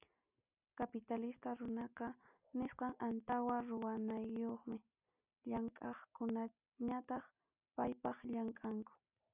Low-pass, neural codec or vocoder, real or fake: 3.6 kHz; none; real